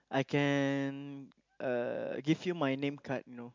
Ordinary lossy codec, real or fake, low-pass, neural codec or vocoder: MP3, 64 kbps; real; 7.2 kHz; none